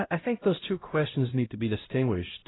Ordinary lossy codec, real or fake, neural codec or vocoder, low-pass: AAC, 16 kbps; fake; codec, 16 kHz, 0.5 kbps, X-Codec, HuBERT features, trained on LibriSpeech; 7.2 kHz